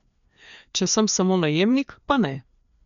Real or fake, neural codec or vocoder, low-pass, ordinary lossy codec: fake; codec, 16 kHz, 2 kbps, FreqCodec, larger model; 7.2 kHz; none